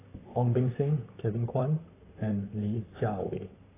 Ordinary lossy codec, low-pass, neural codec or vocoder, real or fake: AAC, 16 kbps; 3.6 kHz; vocoder, 44.1 kHz, 128 mel bands, Pupu-Vocoder; fake